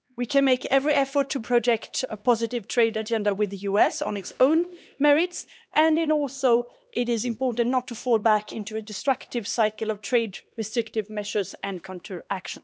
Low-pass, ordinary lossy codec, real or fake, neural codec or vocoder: none; none; fake; codec, 16 kHz, 2 kbps, X-Codec, HuBERT features, trained on LibriSpeech